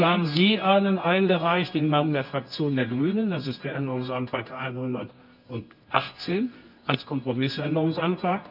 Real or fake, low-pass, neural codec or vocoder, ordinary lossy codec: fake; 5.4 kHz; codec, 24 kHz, 0.9 kbps, WavTokenizer, medium music audio release; none